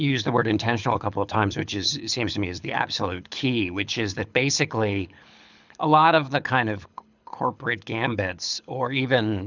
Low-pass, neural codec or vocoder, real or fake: 7.2 kHz; codec, 24 kHz, 6 kbps, HILCodec; fake